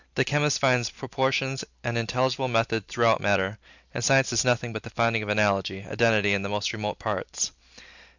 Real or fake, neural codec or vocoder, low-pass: real; none; 7.2 kHz